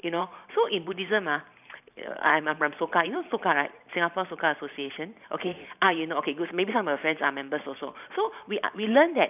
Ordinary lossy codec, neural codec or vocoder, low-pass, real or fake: none; vocoder, 44.1 kHz, 128 mel bands every 512 samples, BigVGAN v2; 3.6 kHz; fake